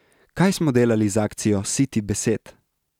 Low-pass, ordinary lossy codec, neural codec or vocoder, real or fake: 19.8 kHz; none; none; real